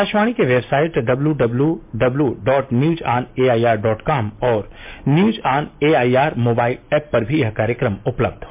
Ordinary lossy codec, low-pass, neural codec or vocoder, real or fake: MP3, 32 kbps; 3.6 kHz; none; real